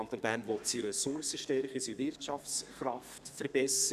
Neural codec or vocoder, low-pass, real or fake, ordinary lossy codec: codec, 32 kHz, 1.9 kbps, SNAC; 14.4 kHz; fake; none